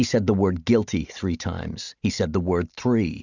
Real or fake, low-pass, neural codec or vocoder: real; 7.2 kHz; none